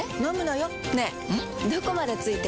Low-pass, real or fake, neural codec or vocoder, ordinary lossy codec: none; real; none; none